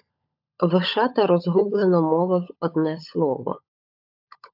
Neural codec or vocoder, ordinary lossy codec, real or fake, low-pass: codec, 16 kHz, 16 kbps, FunCodec, trained on LibriTTS, 50 frames a second; AAC, 48 kbps; fake; 5.4 kHz